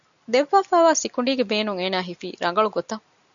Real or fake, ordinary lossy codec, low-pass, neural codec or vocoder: real; MP3, 96 kbps; 7.2 kHz; none